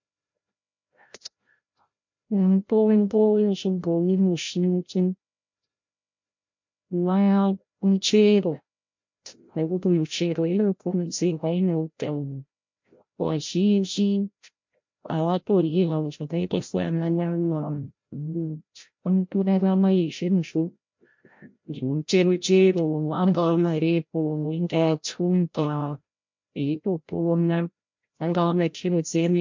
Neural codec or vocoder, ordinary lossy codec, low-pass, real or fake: codec, 16 kHz, 0.5 kbps, FreqCodec, larger model; MP3, 48 kbps; 7.2 kHz; fake